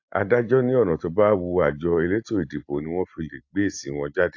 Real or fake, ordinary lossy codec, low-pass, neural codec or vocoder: real; AAC, 48 kbps; 7.2 kHz; none